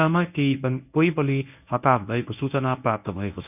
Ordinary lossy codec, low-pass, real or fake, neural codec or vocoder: none; 3.6 kHz; fake; codec, 24 kHz, 0.9 kbps, WavTokenizer, medium speech release version 1